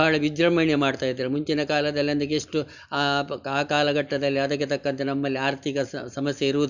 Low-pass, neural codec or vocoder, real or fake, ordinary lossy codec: 7.2 kHz; none; real; none